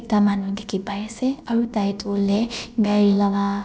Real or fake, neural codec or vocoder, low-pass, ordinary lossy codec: fake; codec, 16 kHz, about 1 kbps, DyCAST, with the encoder's durations; none; none